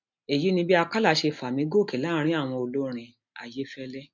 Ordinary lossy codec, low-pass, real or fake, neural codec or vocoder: MP3, 64 kbps; 7.2 kHz; real; none